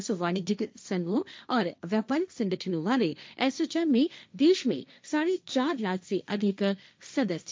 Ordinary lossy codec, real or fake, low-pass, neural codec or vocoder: none; fake; none; codec, 16 kHz, 1.1 kbps, Voila-Tokenizer